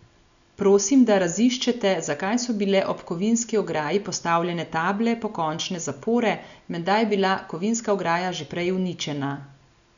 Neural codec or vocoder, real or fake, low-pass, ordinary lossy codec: none; real; 7.2 kHz; none